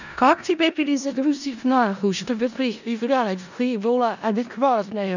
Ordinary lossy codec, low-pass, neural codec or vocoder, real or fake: none; 7.2 kHz; codec, 16 kHz in and 24 kHz out, 0.4 kbps, LongCat-Audio-Codec, four codebook decoder; fake